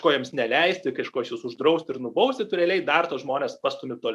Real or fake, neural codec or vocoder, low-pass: real; none; 14.4 kHz